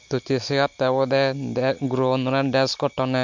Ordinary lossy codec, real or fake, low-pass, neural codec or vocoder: MP3, 48 kbps; real; 7.2 kHz; none